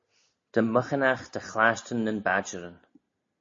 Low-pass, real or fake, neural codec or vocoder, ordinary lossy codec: 7.2 kHz; real; none; MP3, 32 kbps